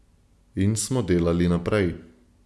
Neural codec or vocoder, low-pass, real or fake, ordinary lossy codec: none; none; real; none